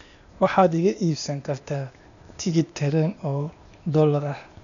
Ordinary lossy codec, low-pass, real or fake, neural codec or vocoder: none; 7.2 kHz; fake; codec, 16 kHz, 0.8 kbps, ZipCodec